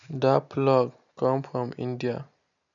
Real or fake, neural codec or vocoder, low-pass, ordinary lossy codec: real; none; 7.2 kHz; none